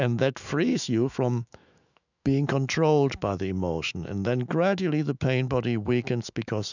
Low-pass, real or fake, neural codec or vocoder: 7.2 kHz; fake; autoencoder, 48 kHz, 128 numbers a frame, DAC-VAE, trained on Japanese speech